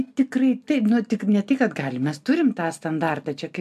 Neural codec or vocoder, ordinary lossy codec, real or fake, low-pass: none; AAC, 96 kbps; real; 14.4 kHz